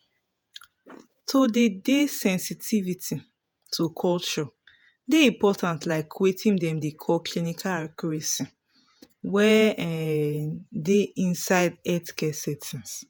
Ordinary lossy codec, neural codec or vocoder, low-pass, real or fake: none; vocoder, 48 kHz, 128 mel bands, Vocos; none; fake